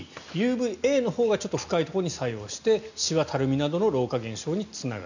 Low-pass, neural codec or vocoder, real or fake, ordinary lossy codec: 7.2 kHz; none; real; none